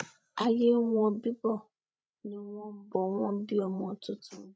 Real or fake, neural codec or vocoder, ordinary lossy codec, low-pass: fake; codec, 16 kHz, 16 kbps, FreqCodec, larger model; none; none